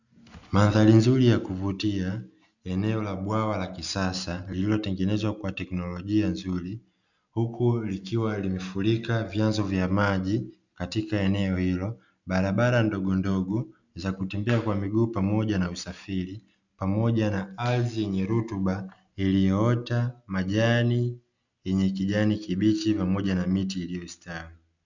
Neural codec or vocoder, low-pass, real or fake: none; 7.2 kHz; real